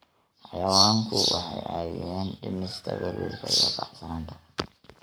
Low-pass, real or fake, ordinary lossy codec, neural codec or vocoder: none; fake; none; codec, 44.1 kHz, 7.8 kbps, Pupu-Codec